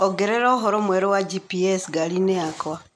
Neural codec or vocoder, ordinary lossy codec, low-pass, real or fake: none; none; none; real